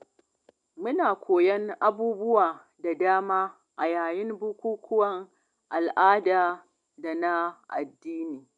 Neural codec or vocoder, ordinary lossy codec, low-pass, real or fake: none; none; 9.9 kHz; real